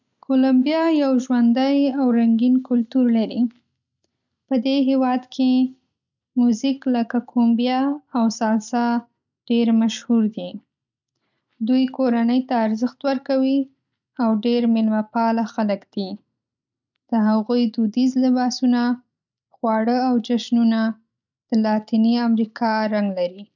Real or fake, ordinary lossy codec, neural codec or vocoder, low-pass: real; none; none; 7.2 kHz